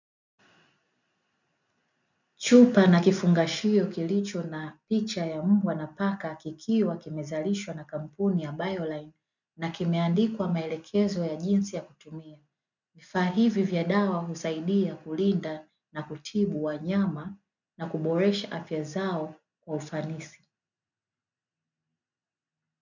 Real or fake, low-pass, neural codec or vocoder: real; 7.2 kHz; none